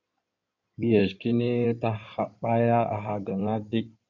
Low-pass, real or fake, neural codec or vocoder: 7.2 kHz; fake; codec, 16 kHz in and 24 kHz out, 2.2 kbps, FireRedTTS-2 codec